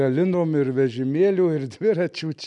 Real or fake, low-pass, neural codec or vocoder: real; 10.8 kHz; none